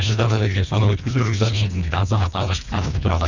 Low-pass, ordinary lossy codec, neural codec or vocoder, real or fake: 7.2 kHz; none; codec, 24 kHz, 1.5 kbps, HILCodec; fake